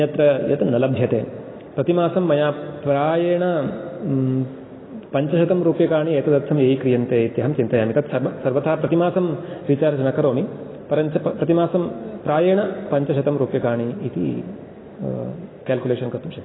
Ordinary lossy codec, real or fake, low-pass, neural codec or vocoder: AAC, 16 kbps; real; 7.2 kHz; none